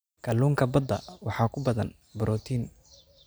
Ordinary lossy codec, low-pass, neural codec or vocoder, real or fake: none; none; none; real